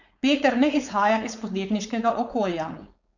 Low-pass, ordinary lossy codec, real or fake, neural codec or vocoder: 7.2 kHz; none; fake; codec, 16 kHz, 4.8 kbps, FACodec